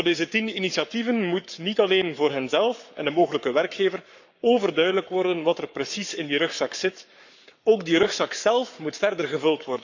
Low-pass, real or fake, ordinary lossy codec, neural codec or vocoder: 7.2 kHz; fake; none; codec, 44.1 kHz, 7.8 kbps, Pupu-Codec